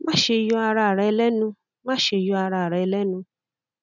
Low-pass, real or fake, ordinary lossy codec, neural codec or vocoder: 7.2 kHz; real; none; none